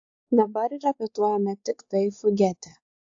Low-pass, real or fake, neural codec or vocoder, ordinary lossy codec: 7.2 kHz; fake; codec, 16 kHz, 4 kbps, X-Codec, WavLM features, trained on Multilingual LibriSpeech; AAC, 48 kbps